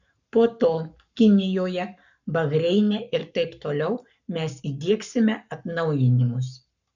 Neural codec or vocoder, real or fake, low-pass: codec, 44.1 kHz, 7.8 kbps, Pupu-Codec; fake; 7.2 kHz